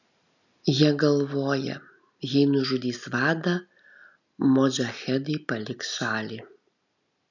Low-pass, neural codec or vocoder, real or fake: 7.2 kHz; none; real